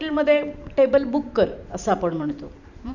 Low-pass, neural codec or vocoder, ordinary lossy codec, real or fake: 7.2 kHz; vocoder, 44.1 kHz, 128 mel bands every 512 samples, BigVGAN v2; none; fake